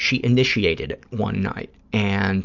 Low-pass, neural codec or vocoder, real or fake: 7.2 kHz; none; real